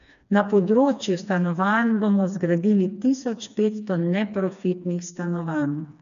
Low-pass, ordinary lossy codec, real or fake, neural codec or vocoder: 7.2 kHz; none; fake; codec, 16 kHz, 2 kbps, FreqCodec, smaller model